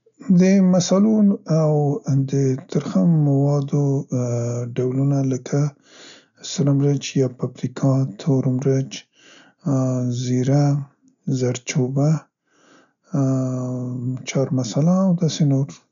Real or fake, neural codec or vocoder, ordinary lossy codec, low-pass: real; none; none; 7.2 kHz